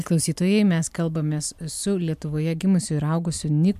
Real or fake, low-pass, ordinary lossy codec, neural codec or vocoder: real; 14.4 kHz; MP3, 96 kbps; none